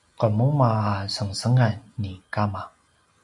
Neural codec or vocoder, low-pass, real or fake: none; 10.8 kHz; real